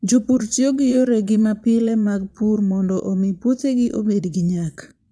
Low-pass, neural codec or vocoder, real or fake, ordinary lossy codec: none; vocoder, 22.05 kHz, 80 mel bands, Vocos; fake; none